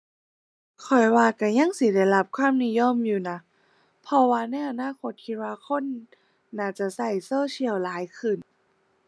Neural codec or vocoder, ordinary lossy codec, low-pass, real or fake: none; none; none; real